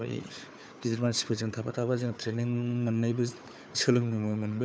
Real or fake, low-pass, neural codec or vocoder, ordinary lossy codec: fake; none; codec, 16 kHz, 4 kbps, FunCodec, trained on Chinese and English, 50 frames a second; none